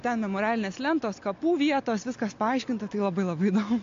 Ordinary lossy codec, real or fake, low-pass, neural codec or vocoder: AAC, 48 kbps; real; 7.2 kHz; none